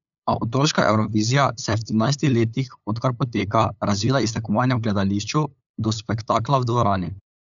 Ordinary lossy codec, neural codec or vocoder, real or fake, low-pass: none; codec, 16 kHz, 8 kbps, FunCodec, trained on LibriTTS, 25 frames a second; fake; 7.2 kHz